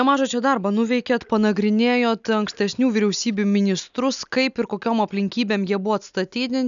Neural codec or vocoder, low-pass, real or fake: none; 7.2 kHz; real